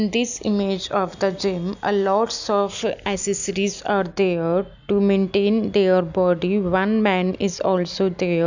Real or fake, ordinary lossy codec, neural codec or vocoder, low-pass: real; none; none; 7.2 kHz